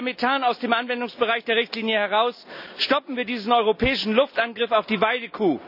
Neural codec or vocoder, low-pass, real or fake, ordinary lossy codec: none; 5.4 kHz; real; none